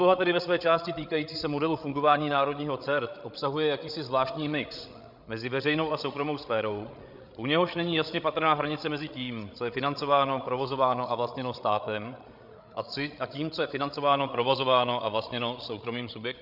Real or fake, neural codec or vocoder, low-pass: fake; codec, 16 kHz, 16 kbps, FreqCodec, larger model; 5.4 kHz